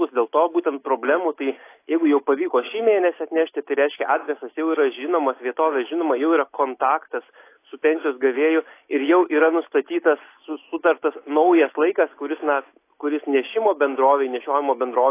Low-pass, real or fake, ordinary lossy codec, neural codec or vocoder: 3.6 kHz; real; AAC, 24 kbps; none